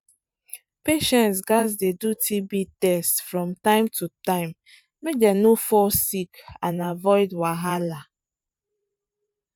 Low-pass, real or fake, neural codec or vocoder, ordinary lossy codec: 19.8 kHz; fake; vocoder, 44.1 kHz, 128 mel bands every 512 samples, BigVGAN v2; none